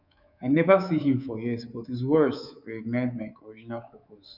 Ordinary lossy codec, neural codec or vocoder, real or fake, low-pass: none; codec, 24 kHz, 3.1 kbps, DualCodec; fake; 5.4 kHz